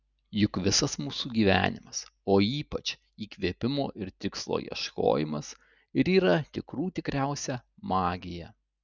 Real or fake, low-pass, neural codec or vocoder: real; 7.2 kHz; none